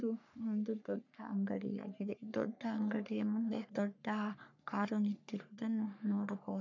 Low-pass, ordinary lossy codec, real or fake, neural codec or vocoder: 7.2 kHz; none; fake; codec, 44.1 kHz, 3.4 kbps, Pupu-Codec